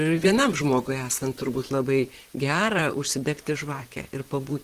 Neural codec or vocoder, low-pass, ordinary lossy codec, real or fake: vocoder, 44.1 kHz, 128 mel bands, Pupu-Vocoder; 14.4 kHz; Opus, 16 kbps; fake